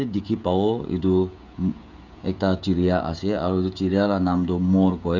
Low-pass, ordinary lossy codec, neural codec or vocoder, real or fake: 7.2 kHz; none; codec, 16 kHz, 16 kbps, FreqCodec, smaller model; fake